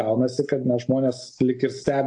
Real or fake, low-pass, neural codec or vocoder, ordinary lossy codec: real; 10.8 kHz; none; AAC, 64 kbps